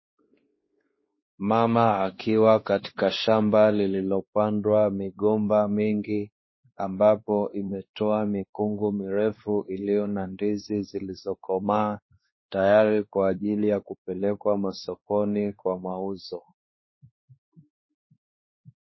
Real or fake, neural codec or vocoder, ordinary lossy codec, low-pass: fake; codec, 16 kHz, 2 kbps, X-Codec, WavLM features, trained on Multilingual LibriSpeech; MP3, 24 kbps; 7.2 kHz